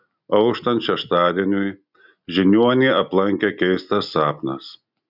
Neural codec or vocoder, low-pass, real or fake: none; 5.4 kHz; real